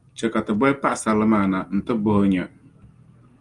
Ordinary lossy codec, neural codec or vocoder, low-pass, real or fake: Opus, 32 kbps; none; 10.8 kHz; real